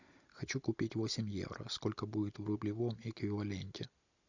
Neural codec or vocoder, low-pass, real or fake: none; 7.2 kHz; real